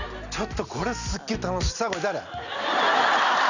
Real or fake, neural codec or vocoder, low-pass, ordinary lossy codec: real; none; 7.2 kHz; none